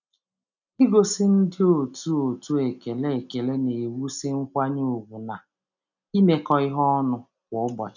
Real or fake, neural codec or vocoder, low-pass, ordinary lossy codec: real; none; 7.2 kHz; none